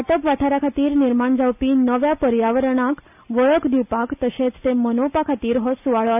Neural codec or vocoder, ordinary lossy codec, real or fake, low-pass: none; none; real; 3.6 kHz